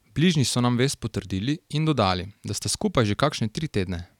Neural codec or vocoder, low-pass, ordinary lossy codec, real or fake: none; 19.8 kHz; none; real